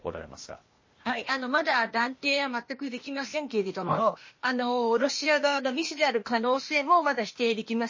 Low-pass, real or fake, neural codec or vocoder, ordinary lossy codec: 7.2 kHz; fake; codec, 16 kHz, 0.8 kbps, ZipCodec; MP3, 32 kbps